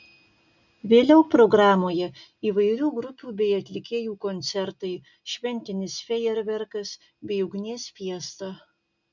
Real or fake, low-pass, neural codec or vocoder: real; 7.2 kHz; none